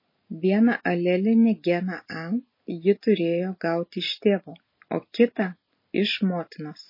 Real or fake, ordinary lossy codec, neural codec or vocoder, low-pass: real; MP3, 24 kbps; none; 5.4 kHz